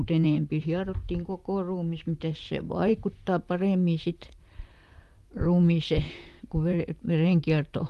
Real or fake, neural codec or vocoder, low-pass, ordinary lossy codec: fake; vocoder, 44.1 kHz, 128 mel bands, Pupu-Vocoder; 14.4 kHz; Opus, 32 kbps